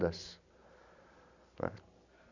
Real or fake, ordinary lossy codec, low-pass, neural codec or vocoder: real; none; 7.2 kHz; none